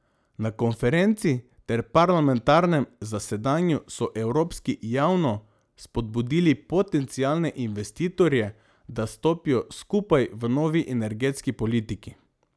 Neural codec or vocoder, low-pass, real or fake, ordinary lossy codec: none; none; real; none